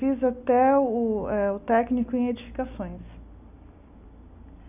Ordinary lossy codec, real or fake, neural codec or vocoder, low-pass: none; real; none; 3.6 kHz